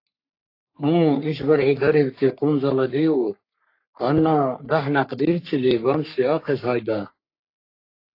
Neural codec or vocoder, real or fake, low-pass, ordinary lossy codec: codec, 44.1 kHz, 3.4 kbps, Pupu-Codec; fake; 5.4 kHz; AAC, 32 kbps